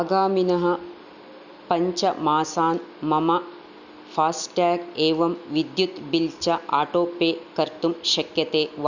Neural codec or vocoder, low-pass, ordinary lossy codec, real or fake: none; 7.2 kHz; none; real